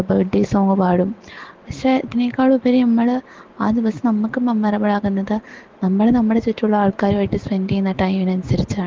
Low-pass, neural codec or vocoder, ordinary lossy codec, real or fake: 7.2 kHz; none; Opus, 16 kbps; real